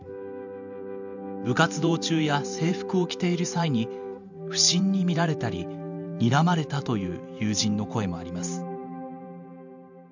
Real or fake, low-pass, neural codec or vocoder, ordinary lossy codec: fake; 7.2 kHz; vocoder, 44.1 kHz, 128 mel bands every 512 samples, BigVGAN v2; none